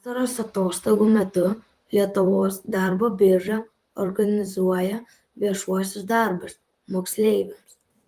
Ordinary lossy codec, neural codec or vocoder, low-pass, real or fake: Opus, 32 kbps; vocoder, 44.1 kHz, 128 mel bands, Pupu-Vocoder; 14.4 kHz; fake